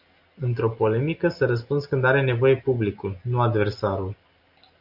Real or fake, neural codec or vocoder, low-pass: real; none; 5.4 kHz